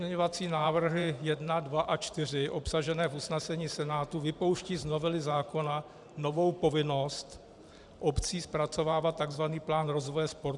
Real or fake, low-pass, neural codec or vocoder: fake; 10.8 kHz; vocoder, 44.1 kHz, 128 mel bands every 512 samples, BigVGAN v2